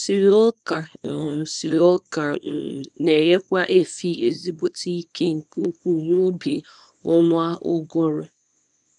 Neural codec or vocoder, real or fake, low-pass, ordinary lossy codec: codec, 24 kHz, 0.9 kbps, WavTokenizer, small release; fake; 10.8 kHz; none